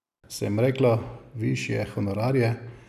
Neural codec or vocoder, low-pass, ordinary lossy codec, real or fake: none; 14.4 kHz; none; real